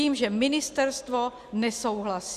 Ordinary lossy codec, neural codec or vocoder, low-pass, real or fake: Opus, 64 kbps; none; 14.4 kHz; real